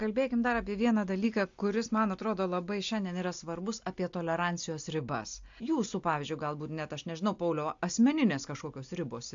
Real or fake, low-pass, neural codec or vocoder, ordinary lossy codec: real; 7.2 kHz; none; AAC, 64 kbps